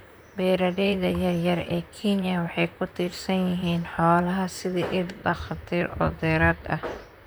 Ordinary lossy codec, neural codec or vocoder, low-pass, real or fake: none; vocoder, 44.1 kHz, 128 mel bands, Pupu-Vocoder; none; fake